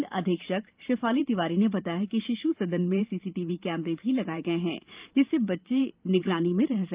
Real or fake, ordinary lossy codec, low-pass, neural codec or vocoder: fake; Opus, 32 kbps; 3.6 kHz; vocoder, 44.1 kHz, 128 mel bands every 512 samples, BigVGAN v2